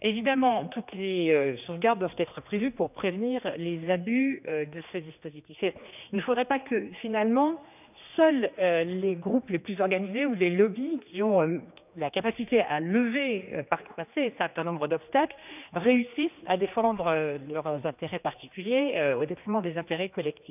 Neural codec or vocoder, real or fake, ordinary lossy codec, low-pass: codec, 16 kHz, 2 kbps, X-Codec, HuBERT features, trained on general audio; fake; none; 3.6 kHz